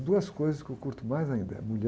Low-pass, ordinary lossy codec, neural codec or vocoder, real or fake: none; none; none; real